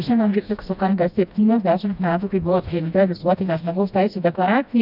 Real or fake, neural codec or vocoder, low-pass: fake; codec, 16 kHz, 1 kbps, FreqCodec, smaller model; 5.4 kHz